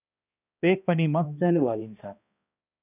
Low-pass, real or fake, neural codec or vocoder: 3.6 kHz; fake; codec, 16 kHz, 1 kbps, X-Codec, HuBERT features, trained on balanced general audio